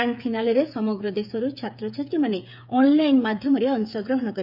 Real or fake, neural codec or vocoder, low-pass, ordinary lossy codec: fake; codec, 16 kHz, 8 kbps, FreqCodec, smaller model; 5.4 kHz; none